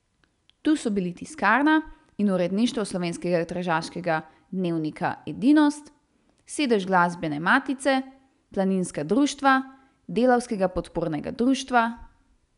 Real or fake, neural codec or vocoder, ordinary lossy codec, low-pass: real; none; none; 10.8 kHz